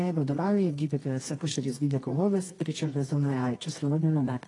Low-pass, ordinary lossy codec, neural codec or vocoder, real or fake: 10.8 kHz; AAC, 32 kbps; codec, 24 kHz, 0.9 kbps, WavTokenizer, medium music audio release; fake